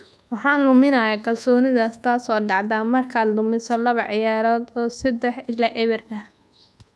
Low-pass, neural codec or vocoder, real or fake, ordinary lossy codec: none; codec, 24 kHz, 1.2 kbps, DualCodec; fake; none